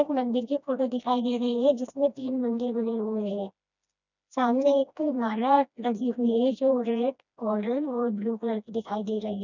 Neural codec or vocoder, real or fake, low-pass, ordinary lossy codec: codec, 16 kHz, 1 kbps, FreqCodec, smaller model; fake; 7.2 kHz; none